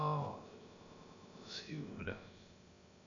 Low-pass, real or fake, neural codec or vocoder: 7.2 kHz; fake; codec, 16 kHz, about 1 kbps, DyCAST, with the encoder's durations